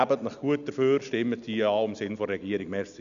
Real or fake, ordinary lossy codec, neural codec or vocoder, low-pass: real; none; none; 7.2 kHz